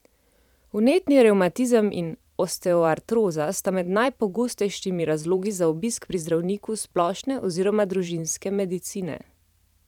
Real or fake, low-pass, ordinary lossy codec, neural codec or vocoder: fake; 19.8 kHz; none; vocoder, 44.1 kHz, 128 mel bands every 512 samples, BigVGAN v2